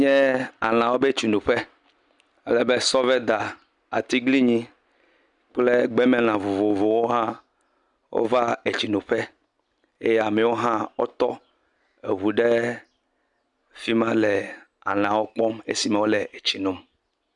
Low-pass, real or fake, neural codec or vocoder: 10.8 kHz; real; none